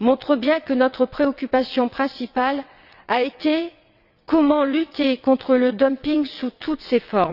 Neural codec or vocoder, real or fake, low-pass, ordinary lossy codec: vocoder, 22.05 kHz, 80 mel bands, WaveNeXt; fake; 5.4 kHz; none